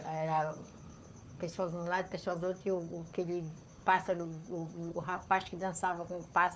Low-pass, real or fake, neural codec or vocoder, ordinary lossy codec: none; fake; codec, 16 kHz, 4 kbps, FunCodec, trained on Chinese and English, 50 frames a second; none